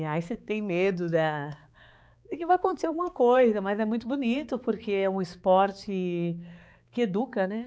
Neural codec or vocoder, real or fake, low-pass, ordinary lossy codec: codec, 16 kHz, 4 kbps, X-Codec, HuBERT features, trained on balanced general audio; fake; none; none